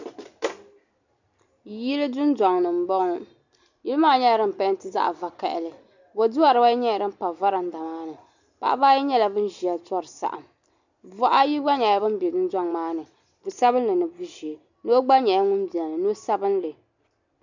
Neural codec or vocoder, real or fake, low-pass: none; real; 7.2 kHz